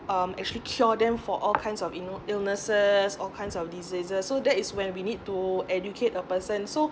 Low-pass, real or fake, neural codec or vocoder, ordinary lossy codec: none; real; none; none